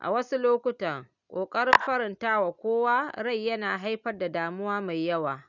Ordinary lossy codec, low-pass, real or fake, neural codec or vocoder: none; 7.2 kHz; real; none